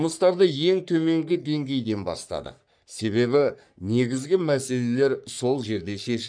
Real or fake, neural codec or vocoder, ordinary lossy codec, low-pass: fake; codec, 44.1 kHz, 3.4 kbps, Pupu-Codec; none; 9.9 kHz